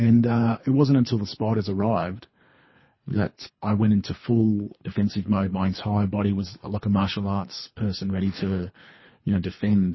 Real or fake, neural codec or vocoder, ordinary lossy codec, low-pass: fake; codec, 24 kHz, 3 kbps, HILCodec; MP3, 24 kbps; 7.2 kHz